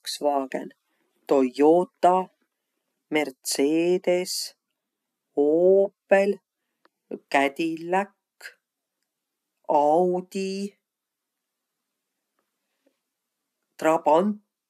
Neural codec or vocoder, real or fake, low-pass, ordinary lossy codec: none; real; 14.4 kHz; none